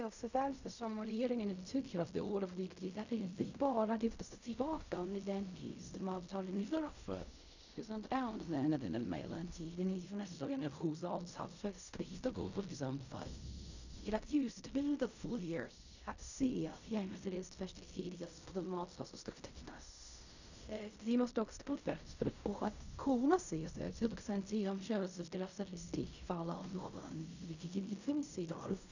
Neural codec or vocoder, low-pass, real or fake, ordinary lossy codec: codec, 16 kHz in and 24 kHz out, 0.4 kbps, LongCat-Audio-Codec, fine tuned four codebook decoder; 7.2 kHz; fake; none